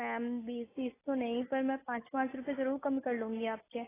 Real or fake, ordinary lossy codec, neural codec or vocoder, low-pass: real; AAC, 16 kbps; none; 3.6 kHz